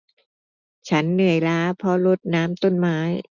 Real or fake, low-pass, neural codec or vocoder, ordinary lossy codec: real; 7.2 kHz; none; none